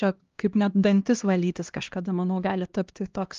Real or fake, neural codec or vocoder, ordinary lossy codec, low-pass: fake; codec, 16 kHz, 1 kbps, X-Codec, HuBERT features, trained on LibriSpeech; Opus, 32 kbps; 7.2 kHz